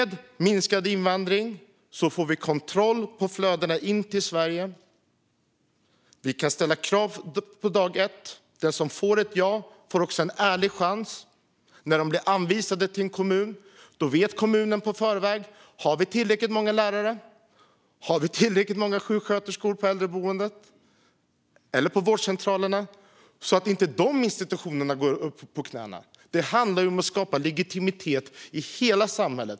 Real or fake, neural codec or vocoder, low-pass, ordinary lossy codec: real; none; none; none